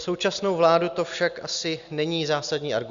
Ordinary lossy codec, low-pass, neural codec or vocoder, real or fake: Opus, 64 kbps; 7.2 kHz; none; real